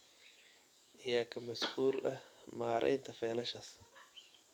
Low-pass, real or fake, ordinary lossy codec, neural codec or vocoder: 19.8 kHz; fake; none; codec, 44.1 kHz, 7.8 kbps, DAC